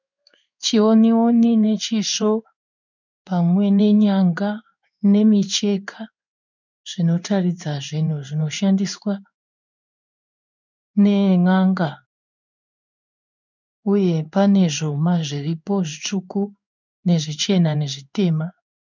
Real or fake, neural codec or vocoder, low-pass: fake; codec, 16 kHz in and 24 kHz out, 1 kbps, XY-Tokenizer; 7.2 kHz